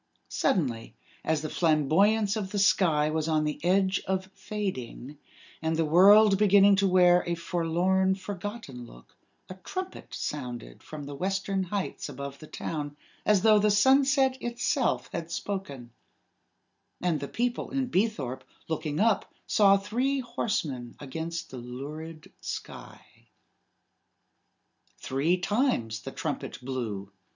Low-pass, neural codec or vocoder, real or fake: 7.2 kHz; none; real